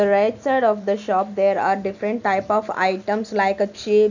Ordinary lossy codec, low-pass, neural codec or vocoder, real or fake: none; 7.2 kHz; none; real